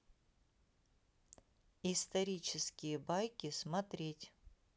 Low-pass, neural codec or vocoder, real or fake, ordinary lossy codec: none; none; real; none